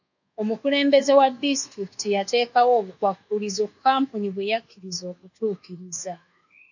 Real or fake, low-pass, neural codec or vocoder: fake; 7.2 kHz; codec, 24 kHz, 1.2 kbps, DualCodec